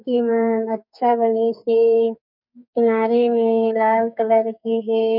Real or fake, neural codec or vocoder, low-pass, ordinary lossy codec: fake; codec, 44.1 kHz, 2.6 kbps, SNAC; 5.4 kHz; none